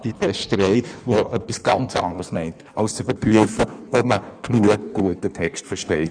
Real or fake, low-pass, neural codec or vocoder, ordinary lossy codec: fake; 9.9 kHz; codec, 16 kHz in and 24 kHz out, 1.1 kbps, FireRedTTS-2 codec; none